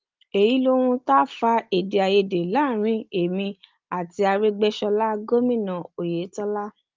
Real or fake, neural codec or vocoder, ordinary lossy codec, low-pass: real; none; Opus, 32 kbps; 7.2 kHz